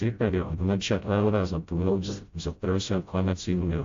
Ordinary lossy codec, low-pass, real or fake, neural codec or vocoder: AAC, 48 kbps; 7.2 kHz; fake; codec, 16 kHz, 0.5 kbps, FreqCodec, smaller model